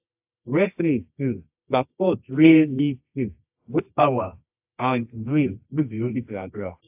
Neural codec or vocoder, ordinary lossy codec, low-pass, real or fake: codec, 24 kHz, 0.9 kbps, WavTokenizer, medium music audio release; AAC, 32 kbps; 3.6 kHz; fake